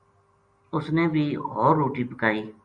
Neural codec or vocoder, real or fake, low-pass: none; real; 9.9 kHz